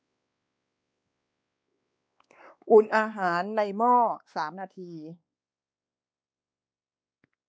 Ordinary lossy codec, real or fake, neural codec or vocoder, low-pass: none; fake; codec, 16 kHz, 2 kbps, X-Codec, WavLM features, trained on Multilingual LibriSpeech; none